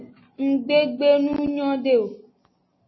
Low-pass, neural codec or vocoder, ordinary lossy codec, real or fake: 7.2 kHz; none; MP3, 24 kbps; real